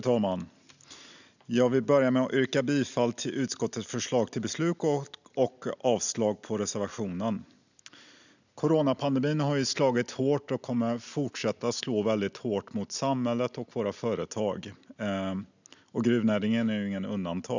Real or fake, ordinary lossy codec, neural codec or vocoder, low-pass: real; none; none; 7.2 kHz